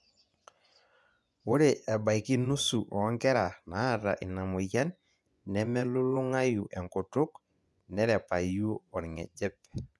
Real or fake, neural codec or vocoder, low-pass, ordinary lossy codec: fake; vocoder, 24 kHz, 100 mel bands, Vocos; none; none